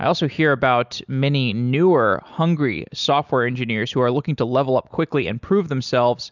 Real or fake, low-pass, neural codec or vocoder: real; 7.2 kHz; none